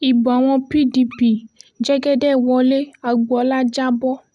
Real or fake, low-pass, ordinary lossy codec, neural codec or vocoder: real; none; none; none